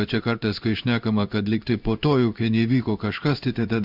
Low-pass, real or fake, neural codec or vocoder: 5.4 kHz; fake; codec, 16 kHz in and 24 kHz out, 1 kbps, XY-Tokenizer